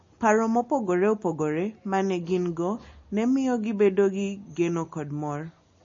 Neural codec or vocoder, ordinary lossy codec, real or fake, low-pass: none; MP3, 32 kbps; real; 7.2 kHz